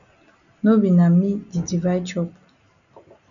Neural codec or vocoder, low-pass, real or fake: none; 7.2 kHz; real